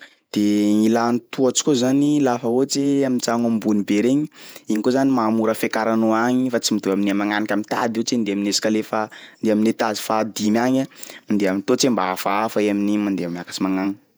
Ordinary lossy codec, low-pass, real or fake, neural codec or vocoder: none; none; fake; vocoder, 48 kHz, 128 mel bands, Vocos